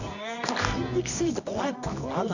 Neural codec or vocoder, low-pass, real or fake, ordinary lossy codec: codec, 24 kHz, 0.9 kbps, WavTokenizer, medium music audio release; 7.2 kHz; fake; none